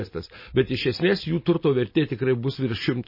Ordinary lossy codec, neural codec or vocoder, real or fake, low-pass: MP3, 24 kbps; none; real; 5.4 kHz